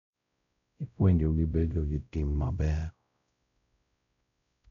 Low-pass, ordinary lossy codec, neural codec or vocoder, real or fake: 7.2 kHz; none; codec, 16 kHz, 0.5 kbps, X-Codec, WavLM features, trained on Multilingual LibriSpeech; fake